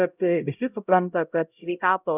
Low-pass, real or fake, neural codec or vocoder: 3.6 kHz; fake; codec, 16 kHz, 0.5 kbps, X-Codec, HuBERT features, trained on LibriSpeech